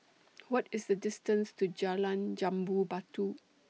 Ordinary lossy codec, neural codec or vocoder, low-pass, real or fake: none; none; none; real